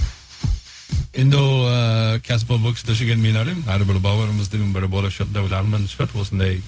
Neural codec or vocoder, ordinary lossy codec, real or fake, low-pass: codec, 16 kHz, 0.4 kbps, LongCat-Audio-Codec; none; fake; none